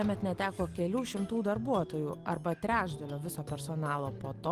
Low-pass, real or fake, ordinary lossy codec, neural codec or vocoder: 14.4 kHz; real; Opus, 24 kbps; none